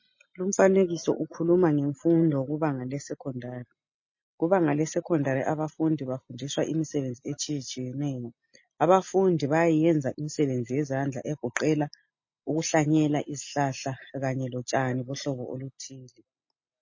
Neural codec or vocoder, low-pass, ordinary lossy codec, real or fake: none; 7.2 kHz; MP3, 32 kbps; real